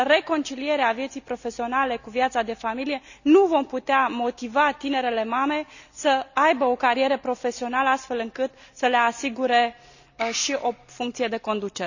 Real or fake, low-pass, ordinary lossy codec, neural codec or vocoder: real; 7.2 kHz; none; none